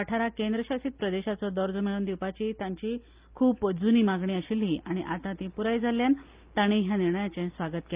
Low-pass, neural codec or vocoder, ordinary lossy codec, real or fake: 3.6 kHz; none; Opus, 24 kbps; real